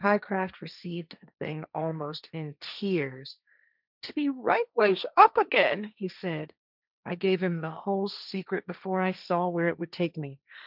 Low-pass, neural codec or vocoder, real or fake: 5.4 kHz; codec, 16 kHz, 1.1 kbps, Voila-Tokenizer; fake